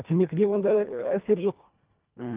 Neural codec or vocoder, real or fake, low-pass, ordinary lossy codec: codec, 24 kHz, 1.5 kbps, HILCodec; fake; 3.6 kHz; Opus, 32 kbps